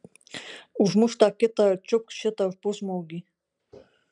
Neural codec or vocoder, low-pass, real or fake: none; 10.8 kHz; real